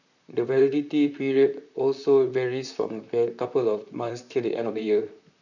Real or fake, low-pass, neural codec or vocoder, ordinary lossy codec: fake; 7.2 kHz; vocoder, 44.1 kHz, 128 mel bands, Pupu-Vocoder; none